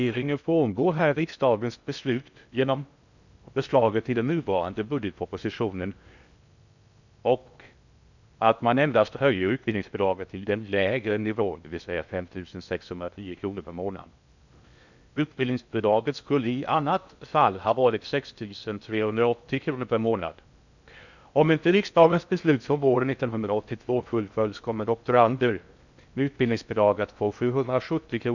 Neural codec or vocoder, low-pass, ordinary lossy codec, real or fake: codec, 16 kHz in and 24 kHz out, 0.6 kbps, FocalCodec, streaming, 2048 codes; 7.2 kHz; none; fake